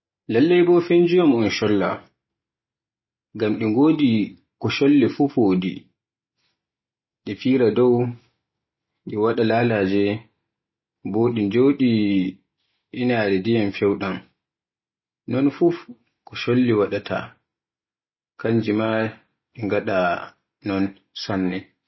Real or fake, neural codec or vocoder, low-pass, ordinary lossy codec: real; none; 7.2 kHz; MP3, 24 kbps